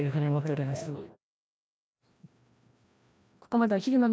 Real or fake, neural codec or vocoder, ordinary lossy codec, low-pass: fake; codec, 16 kHz, 1 kbps, FreqCodec, larger model; none; none